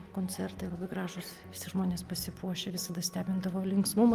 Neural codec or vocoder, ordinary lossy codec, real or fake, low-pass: none; Opus, 32 kbps; real; 14.4 kHz